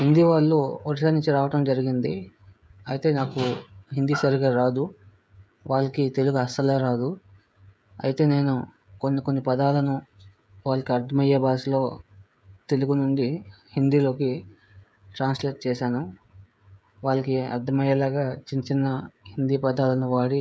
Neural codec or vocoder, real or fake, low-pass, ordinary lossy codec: codec, 16 kHz, 16 kbps, FreqCodec, smaller model; fake; none; none